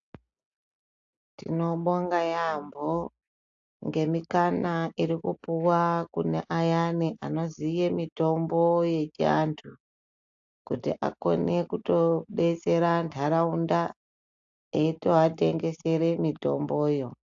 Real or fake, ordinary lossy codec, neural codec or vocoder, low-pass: real; AAC, 64 kbps; none; 7.2 kHz